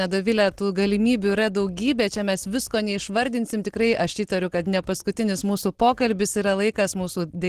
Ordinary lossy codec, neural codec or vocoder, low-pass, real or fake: Opus, 16 kbps; none; 14.4 kHz; real